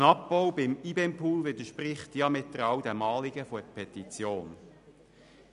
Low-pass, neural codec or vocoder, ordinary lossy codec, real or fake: 10.8 kHz; none; none; real